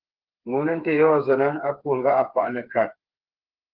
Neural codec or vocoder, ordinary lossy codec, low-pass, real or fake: codec, 16 kHz, 4 kbps, FreqCodec, smaller model; Opus, 16 kbps; 5.4 kHz; fake